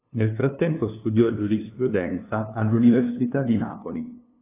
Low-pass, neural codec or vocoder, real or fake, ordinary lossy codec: 3.6 kHz; codec, 16 kHz, 2 kbps, FunCodec, trained on LibriTTS, 25 frames a second; fake; AAC, 16 kbps